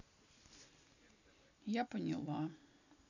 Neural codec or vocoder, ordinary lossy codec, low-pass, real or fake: none; none; 7.2 kHz; real